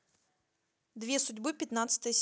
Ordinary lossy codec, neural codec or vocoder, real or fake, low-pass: none; none; real; none